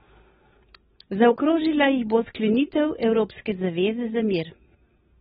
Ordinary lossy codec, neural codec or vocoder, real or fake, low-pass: AAC, 16 kbps; none; real; 19.8 kHz